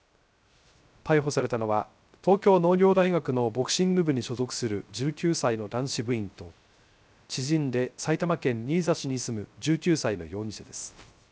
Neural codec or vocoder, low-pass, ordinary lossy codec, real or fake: codec, 16 kHz, 0.3 kbps, FocalCodec; none; none; fake